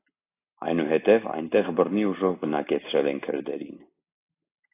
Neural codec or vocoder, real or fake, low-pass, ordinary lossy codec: none; real; 3.6 kHz; AAC, 24 kbps